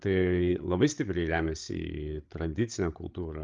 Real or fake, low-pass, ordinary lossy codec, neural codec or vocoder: fake; 7.2 kHz; Opus, 32 kbps; codec, 16 kHz, 8 kbps, FreqCodec, larger model